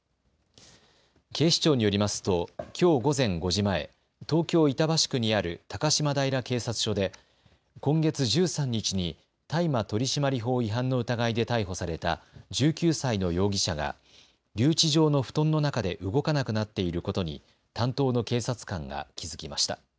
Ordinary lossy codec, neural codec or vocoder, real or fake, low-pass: none; none; real; none